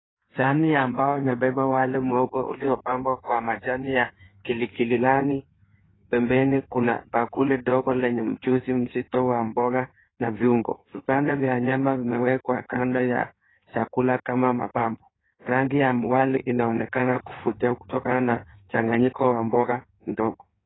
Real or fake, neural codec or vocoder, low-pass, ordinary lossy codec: fake; codec, 16 kHz in and 24 kHz out, 1.1 kbps, FireRedTTS-2 codec; 7.2 kHz; AAC, 16 kbps